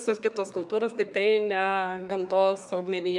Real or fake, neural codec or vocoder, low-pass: fake; codec, 24 kHz, 1 kbps, SNAC; 10.8 kHz